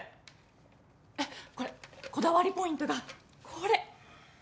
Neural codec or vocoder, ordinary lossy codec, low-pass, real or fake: none; none; none; real